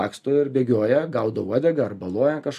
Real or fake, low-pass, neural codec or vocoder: real; 14.4 kHz; none